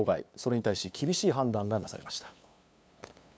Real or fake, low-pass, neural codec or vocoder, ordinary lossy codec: fake; none; codec, 16 kHz, 2 kbps, FunCodec, trained on LibriTTS, 25 frames a second; none